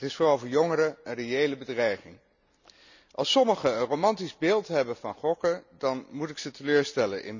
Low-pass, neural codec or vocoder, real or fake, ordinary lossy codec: 7.2 kHz; none; real; none